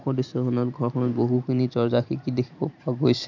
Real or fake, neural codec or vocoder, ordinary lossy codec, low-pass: fake; vocoder, 44.1 kHz, 128 mel bands every 512 samples, BigVGAN v2; none; 7.2 kHz